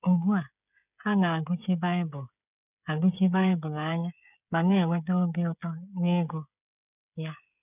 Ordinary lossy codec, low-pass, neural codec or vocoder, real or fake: none; 3.6 kHz; codec, 16 kHz, 8 kbps, FreqCodec, smaller model; fake